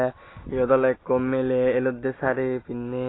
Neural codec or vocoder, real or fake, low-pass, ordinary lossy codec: none; real; 7.2 kHz; AAC, 16 kbps